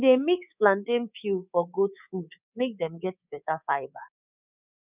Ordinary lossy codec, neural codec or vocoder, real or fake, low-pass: none; codec, 24 kHz, 3.1 kbps, DualCodec; fake; 3.6 kHz